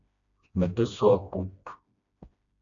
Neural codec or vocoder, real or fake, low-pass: codec, 16 kHz, 1 kbps, FreqCodec, smaller model; fake; 7.2 kHz